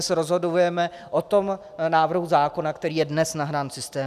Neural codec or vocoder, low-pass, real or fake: none; 14.4 kHz; real